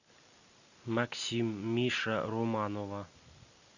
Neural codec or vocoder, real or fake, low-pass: none; real; 7.2 kHz